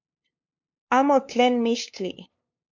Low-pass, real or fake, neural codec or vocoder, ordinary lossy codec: 7.2 kHz; fake; codec, 16 kHz, 2 kbps, FunCodec, trained on LibriTTS, 25 frames a second; MP3, 64 kbps